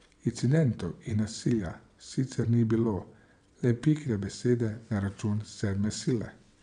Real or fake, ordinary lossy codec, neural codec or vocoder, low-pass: fake; none; vocoder, 22.05 kHz, 80 mel bands, WaveNeXt; 9.9 kHz